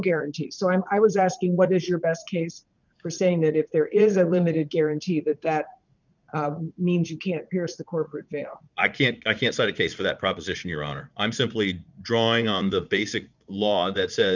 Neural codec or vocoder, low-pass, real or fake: vocoder, 44.1 kHz, 80 mel bands, Vocos; 7.2 kHz; fake